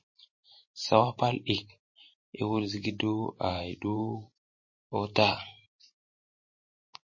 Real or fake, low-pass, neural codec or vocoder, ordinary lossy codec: fake; 7.2 kHz; vocoder, 44.1 kHz, 128 mel bands every 512 samples, BigVGAN v2; MP3, 32 kbps